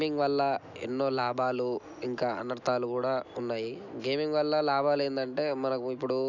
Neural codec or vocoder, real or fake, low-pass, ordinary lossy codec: none; real; 7.2 kHz; none